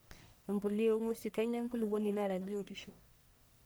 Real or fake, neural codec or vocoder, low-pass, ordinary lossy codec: fake; codec, 44.1 kHz, 1.7 kbps, Pupu-Codec; none; none